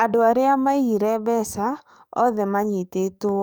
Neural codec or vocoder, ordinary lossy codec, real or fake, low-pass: codec, 44.1 kHz, 7.8 kbps, DAC; none; fake; none